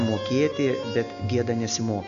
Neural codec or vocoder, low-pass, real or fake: none; 7.2 kHz; real